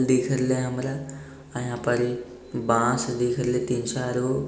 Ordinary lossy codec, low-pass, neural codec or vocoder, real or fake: none; none; none; real